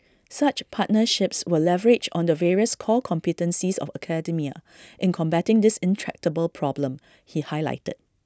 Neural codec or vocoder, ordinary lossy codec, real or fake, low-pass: none; none; real; none